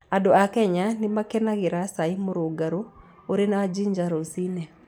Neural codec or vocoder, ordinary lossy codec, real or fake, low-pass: none; none; real; 19.8 kHz